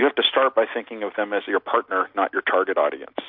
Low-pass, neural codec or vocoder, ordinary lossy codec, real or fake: 5.4 kHz; none; MP3, 48 kbps; real